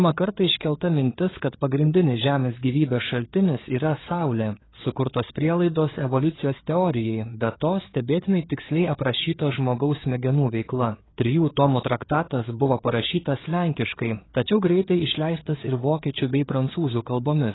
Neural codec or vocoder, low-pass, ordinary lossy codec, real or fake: codec, 16 kHz, 4 kbps, FreqCodec, larger model; 7.2 kHz; AAC, 16 kbps; fake